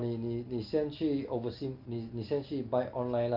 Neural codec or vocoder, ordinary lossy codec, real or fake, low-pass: none; Opus, 32 kbps; real; 5.4 kHz